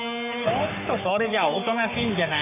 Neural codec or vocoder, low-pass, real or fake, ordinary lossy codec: codec, 44.1 kHz, 3.4 kbps, Pupu-Codec; 3.6 kHz; fake; none